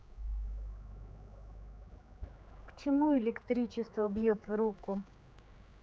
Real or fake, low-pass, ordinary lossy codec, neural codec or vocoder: fake; none; none; codec, 16 kHz, 4 kbps, X-Codec, HuBERT features, trained on general audio